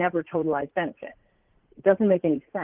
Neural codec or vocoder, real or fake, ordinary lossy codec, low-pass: vocoder, 44.1 kHz, 80 mel bands, Vocos; fake; Opus, 16 kbps; 3.6 kHz